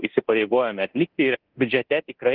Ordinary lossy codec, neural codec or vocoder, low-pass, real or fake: Opus, 16 kbps; codec, 24 kHz, 0.9 kbps, DualCodec; 5.4 kHz; fake